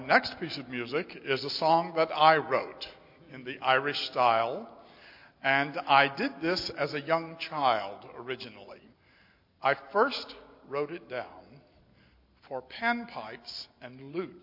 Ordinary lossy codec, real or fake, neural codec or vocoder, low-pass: MP3, 32 kbps; real; none; 5.4 kHz